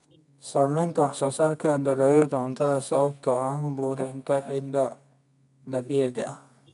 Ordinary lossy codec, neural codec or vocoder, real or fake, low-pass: none; codec, 24 kHz, 0.9 kbps, WavTokenizer, medium music audio release; fake; 10.8 kHz